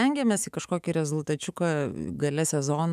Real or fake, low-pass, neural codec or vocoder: fake; 14.4 kHz; autoencoder, 48 kHz, 128 numbers a frame, DAC-VAE, trained on Japanese speech